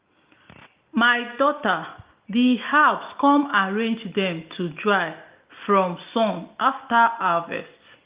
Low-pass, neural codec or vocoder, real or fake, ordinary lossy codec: 3.6 kHz; none; real; Opus, 32 kbps